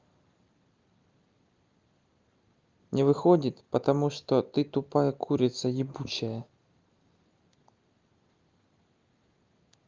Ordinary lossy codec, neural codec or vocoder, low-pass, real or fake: Opus, 32 kbps; none; 7.2 kHz; real